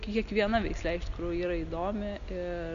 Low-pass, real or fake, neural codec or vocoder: 7.2 kHz; real; none